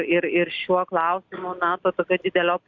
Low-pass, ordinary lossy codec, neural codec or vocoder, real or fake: 7.2 kHz; Opus, 64 kbps; none; real